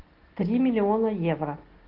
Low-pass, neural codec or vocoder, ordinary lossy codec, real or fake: 5.4 kHz; none; Opus, 16 kbps; real